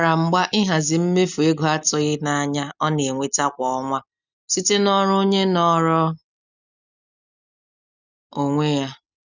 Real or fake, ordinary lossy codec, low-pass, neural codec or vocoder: real; none; 7.2 kHz; none